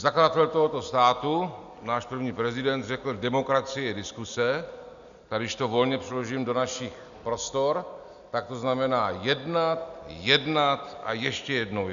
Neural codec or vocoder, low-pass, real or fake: none; 7.2 kHz; real